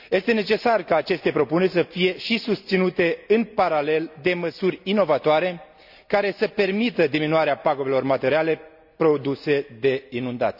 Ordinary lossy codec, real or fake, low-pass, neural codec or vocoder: none; real; 5.4 kHz; none